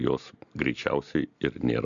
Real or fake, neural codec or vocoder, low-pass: real; none; 7.2 kHz